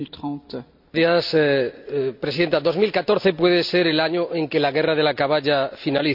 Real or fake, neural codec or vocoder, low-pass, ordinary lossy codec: real; none; 5.4 kHz; none